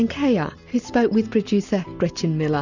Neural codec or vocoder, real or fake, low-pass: none; real; 7.2 kHz